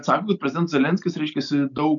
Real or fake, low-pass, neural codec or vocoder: real; 7.2 kHz; none